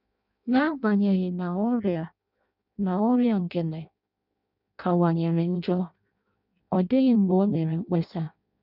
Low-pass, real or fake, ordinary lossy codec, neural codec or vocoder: 5.4 kHz; fake; none; codec, 16 kHz in and 24 kHz out, 0.6 kbps, FireRedTTS-2 codec